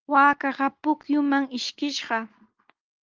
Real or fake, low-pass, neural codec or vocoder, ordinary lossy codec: real; 7.2 kHz; none; Opus, 24 kbps